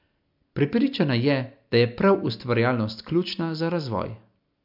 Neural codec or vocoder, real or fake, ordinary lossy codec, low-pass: none; real; MP3, 48 kbps; 5.4 kHz